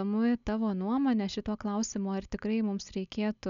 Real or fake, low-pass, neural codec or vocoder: real; 7.2 kHz; none